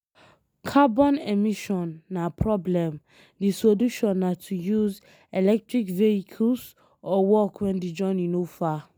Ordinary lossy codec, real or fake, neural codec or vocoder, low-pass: none; real; none; none